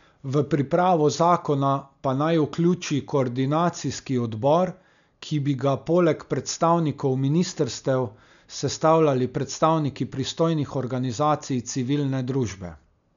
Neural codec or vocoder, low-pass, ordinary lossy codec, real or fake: none; 7.2 kHz; none; real